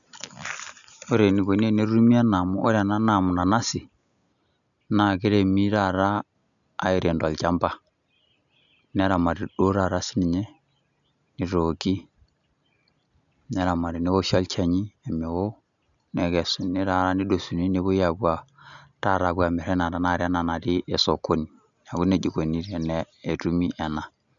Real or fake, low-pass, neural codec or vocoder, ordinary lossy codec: real; 7.2 kHz; none; none